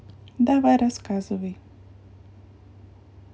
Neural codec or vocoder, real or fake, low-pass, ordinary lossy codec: none; real; none; none